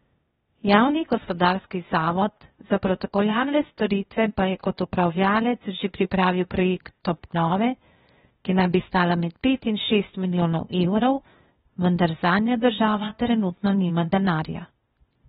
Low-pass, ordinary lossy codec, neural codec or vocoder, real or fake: 7.2 kHz; AAC, 16 kbps; codec, 16 kHz, 0.8 kbps, ZipCodec; fake